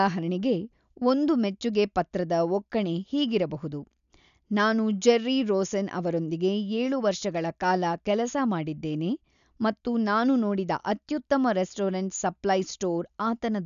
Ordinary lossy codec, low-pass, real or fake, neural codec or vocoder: none; 7.2 kHz; real; none